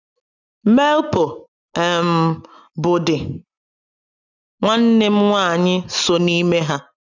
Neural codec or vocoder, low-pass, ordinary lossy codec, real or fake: none; 7.2 kHz; none; real